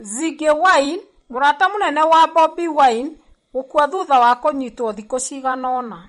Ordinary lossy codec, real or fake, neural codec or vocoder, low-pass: MP3, 48 kbps; fake; vocoder, 44.1 kHz, 128 mel bands every 256 samples, BigVGAN v2; 19.8 kHz